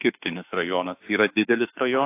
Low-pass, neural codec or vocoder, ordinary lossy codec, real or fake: 3.6 kHz; autoencoder, 48 kHz, 32 numbers a frame, DAC-VAE, trained on Japanese speech; AAC, 24 kbps; fake